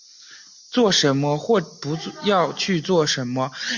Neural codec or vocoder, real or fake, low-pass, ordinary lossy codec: none; real; 7.2 kHz; MP3, 48 kbps